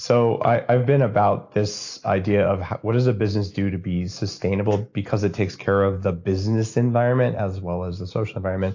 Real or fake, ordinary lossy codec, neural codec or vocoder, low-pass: real; AAC, 48 kbps; none; 7.2 kHz